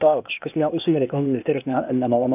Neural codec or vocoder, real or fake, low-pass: codec, 16 kHz, 0.8 kbps, ZipCodec; fake; 3.6 kHz